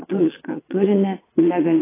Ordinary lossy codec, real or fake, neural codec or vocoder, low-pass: AAC, 16 kbps; fake; vocoder, 22.05 kHz, 80 mel bands, WaveNeXt; 3.6 kHz